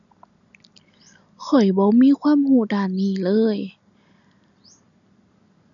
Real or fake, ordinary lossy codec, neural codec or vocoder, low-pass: real; none; none; 7.2 kHz